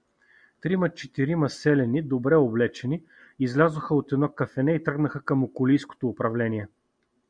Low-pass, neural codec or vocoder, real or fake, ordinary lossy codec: 9.9 kHz; none; real; MP3, 96 kbps